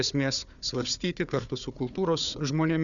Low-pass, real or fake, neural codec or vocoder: 7.2 kHz; fake; codec, 16 kHz, 4 kbps, FunCodec, trained on Chinese and English, 50 frames a second